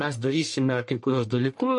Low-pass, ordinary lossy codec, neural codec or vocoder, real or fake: 10.8 kHz; MP3, 48 kbps; codec, 44.1 kHz, 1.7 kbps, Pupu-Codec; fake